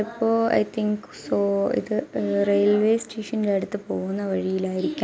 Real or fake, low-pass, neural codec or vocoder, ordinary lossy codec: real; none; none; none